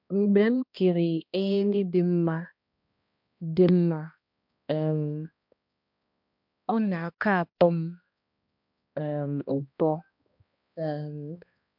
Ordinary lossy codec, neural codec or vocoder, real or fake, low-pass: MP3, 48 kbps; codec, 16 kHz, 1 kbps, X-Codec, HuBERT features, trained on balanced general audio; fake; 5.4 kHz